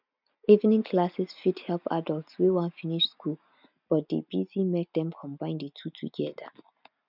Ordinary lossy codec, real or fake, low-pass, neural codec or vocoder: none; real; 5.4 kHz; none